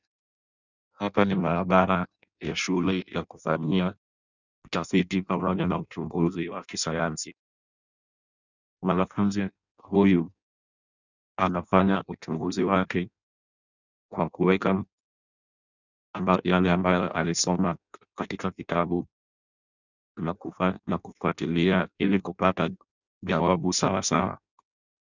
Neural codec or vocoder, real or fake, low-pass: codec, 16 kHz in and 24 kHz out, 0.6 kbps, FireRedTTS-2 codec; fake; 7.2 kHz